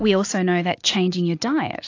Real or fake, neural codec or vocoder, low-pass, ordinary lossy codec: real; none; 7.2 kHz; AAC, 48 kbps